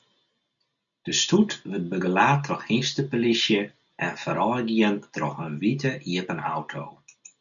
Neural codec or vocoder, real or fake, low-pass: none; real; 7.2 kHz